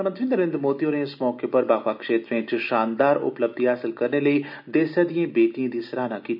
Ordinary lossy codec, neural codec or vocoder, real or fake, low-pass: none; none; real; 5.4 kHz